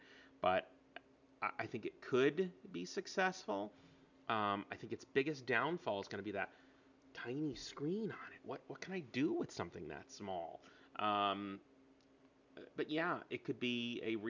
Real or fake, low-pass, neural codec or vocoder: real; 7.2 kHz; none